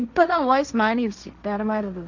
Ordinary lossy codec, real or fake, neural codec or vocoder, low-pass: none; fake; codec, 16 kHz, 1.1 kbps, Voila-Tokenizer; 7.2 kHz